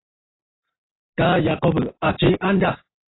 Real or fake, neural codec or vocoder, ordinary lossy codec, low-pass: real; none; AAC, 16 kbps; 7.2 kHz